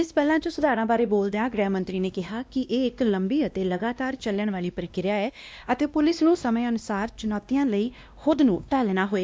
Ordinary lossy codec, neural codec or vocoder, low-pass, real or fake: none; codec, 16 kHz, 1 kbps, X-Codec, WavLM features, trained on Multilingual LibriSpeech; none; fake